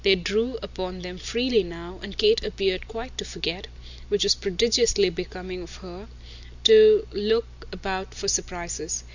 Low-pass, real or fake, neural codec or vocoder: 7.2 kHz; real; none